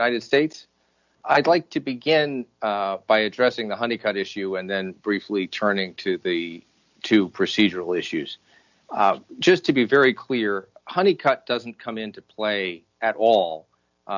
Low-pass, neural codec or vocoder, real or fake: 7.2 kHz; none; real